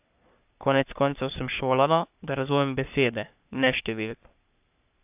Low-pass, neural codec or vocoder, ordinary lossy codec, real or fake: 3.6 kHz; codec, 44.1 kHz, 3.4 kbps, Pupu-Codec; AAC, 32 kbps; fake